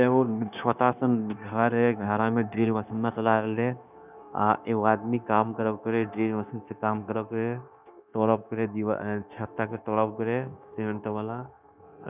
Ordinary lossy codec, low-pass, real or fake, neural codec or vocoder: none; 3.6 kHz; fake; codec, 16 kHz, 0.9 kbps, LongCat-Audio-Codec